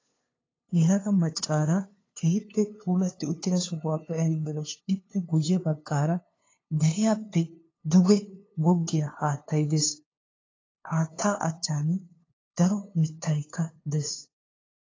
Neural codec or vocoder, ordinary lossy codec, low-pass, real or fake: codec, 16 kHz, 2 kbps, FunCodec, trained on LibriTTS, 25 frames a second; AAC, 32 kbps; 7.2 kHz; fake